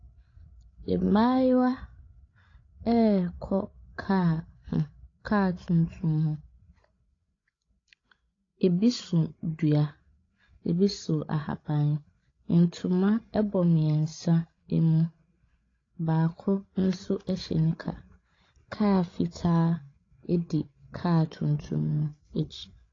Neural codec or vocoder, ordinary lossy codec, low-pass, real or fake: codec, 16 kHz, 16 kbps, FreqCodec, larger model; AAC, 32 kbps; 7.2 kHz; fake